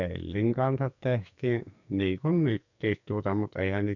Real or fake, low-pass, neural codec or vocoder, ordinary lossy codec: fake; 7.2 kHz; codec, 44.1 kHz, 2.6 kbps, SNAC; none